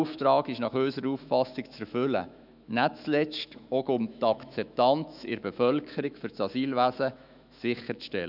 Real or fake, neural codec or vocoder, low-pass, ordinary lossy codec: fake; autoencoder, 48 kHz, 128 numbers a frame, DAC-VAE, trained on Japanese speech; 5.4 kHz; none